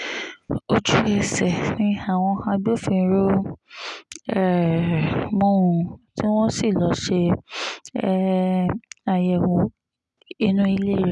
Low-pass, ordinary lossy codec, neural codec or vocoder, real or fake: 10.8 kHz; none; none; real